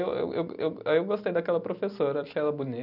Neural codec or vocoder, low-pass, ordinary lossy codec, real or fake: none; 5.4 kHz; none; real